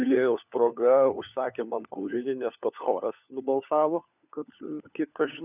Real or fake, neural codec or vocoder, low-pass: fake; codec, 16 kHz, 4 kbps, FunCodec, trained on LibriTTS, 50 frames a second; 3.6 kHz